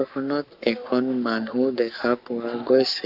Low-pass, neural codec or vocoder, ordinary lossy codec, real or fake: 5.4 kHz; codec, 44.1 kHz, 7.8 kbps, Pupu-Codec; none; fake